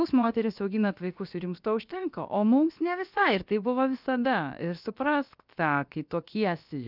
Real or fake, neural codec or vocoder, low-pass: fake; codec, 16 kHz, about 1 kbps, DyCAST, with the encoder's durations; 5.4 kHz